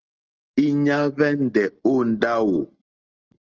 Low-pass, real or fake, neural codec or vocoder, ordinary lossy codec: 7.2 kHz; real; none; Opus, 16 kbps